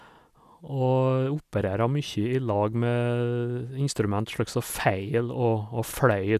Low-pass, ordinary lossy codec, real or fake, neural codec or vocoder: 14.4 kHz; none; real; none